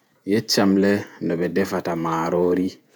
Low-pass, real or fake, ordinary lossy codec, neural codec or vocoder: none; fake; none; vocoder, 48 kHz, 128 mel bands, Vocos